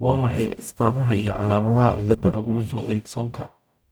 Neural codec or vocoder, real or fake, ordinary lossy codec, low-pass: codec, 44.1 kHz, 0.9 kbps, DAC; fake; none; none